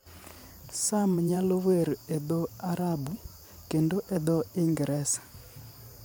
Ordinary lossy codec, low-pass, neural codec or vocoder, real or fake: none; none; vocoder, 44.1 kHz, 128 mel bands every 512 samples, BigVGAN v2; fake